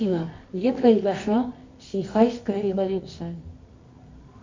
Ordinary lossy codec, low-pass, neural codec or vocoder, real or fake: MP3, 48 kbps; 7.2 kHz; codec, 24 kHz, 0.9 kbps, WavTokenizer, medium music audio release; fake